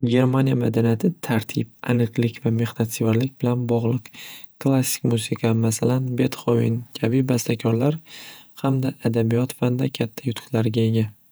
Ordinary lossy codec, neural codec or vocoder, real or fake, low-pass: none; vocoder, 48 kHz, 128 mel bands, Vocos; fake; none